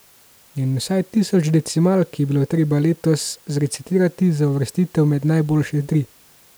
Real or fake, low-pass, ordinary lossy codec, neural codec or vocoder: real; none; none; none